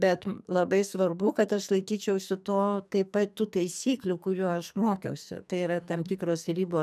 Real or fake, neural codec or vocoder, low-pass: fake; codec, 44.1 kHz, 2.6 kbps, SNAC; 14.4 kHz